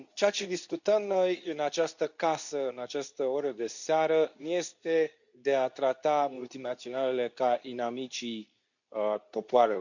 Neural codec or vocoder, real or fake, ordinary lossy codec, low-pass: codec, 24 kHz, 0.9 kbps, WavTokenizer, medium speech release version 2; fake; none; 7.2 kHz